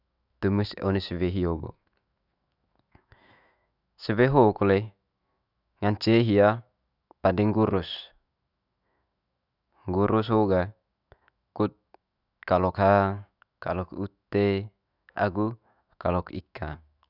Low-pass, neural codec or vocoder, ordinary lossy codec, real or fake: 5.4 kHz; autoencoder, 48 kHz, 128 numbers a frame, DAC-VAE, trained on Japanese speech; none; fake